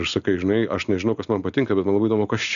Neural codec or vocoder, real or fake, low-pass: none; real; 7.2 kHz